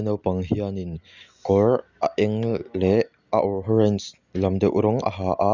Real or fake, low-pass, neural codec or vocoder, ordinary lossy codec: real; 7.2 kHz; none; Opus, 64 kbps